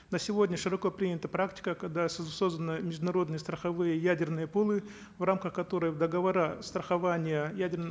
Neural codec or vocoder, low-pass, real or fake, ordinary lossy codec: none; none; real; none